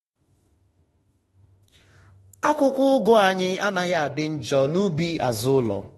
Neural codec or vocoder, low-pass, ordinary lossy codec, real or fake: autoencoder, 48 kHz, 32 numbers a frame, DAC-VAE, trained on Japanese speech; 19.8 kHz; AAC, 32 kbps; fake